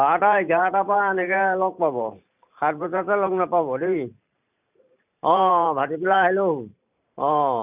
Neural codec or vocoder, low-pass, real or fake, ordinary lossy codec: vocoder, 44.1 kHz, 128 mel bands every 512 samples, BigVGAN v2; 3.6 kHz; fake; none